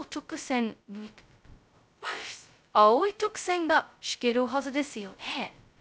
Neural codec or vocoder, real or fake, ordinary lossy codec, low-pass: codec, 16 kHz, 0.2 kbps, FocalCodec; fake; none; none